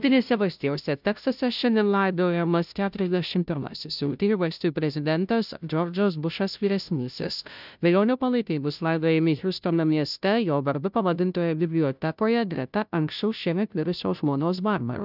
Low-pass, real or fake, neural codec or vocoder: 5.4 kHz; fake; codec, 16 kHz, 0.5 kbps, FunCodec, trained on Chinese and English, 25 frames a second